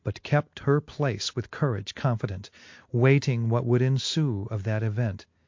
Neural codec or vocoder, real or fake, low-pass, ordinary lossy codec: none; real; 7.2 kHz; MP3, 48 kbps